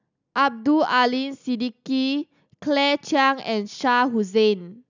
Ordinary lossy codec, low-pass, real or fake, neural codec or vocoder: none; 7.2 kHz; real; none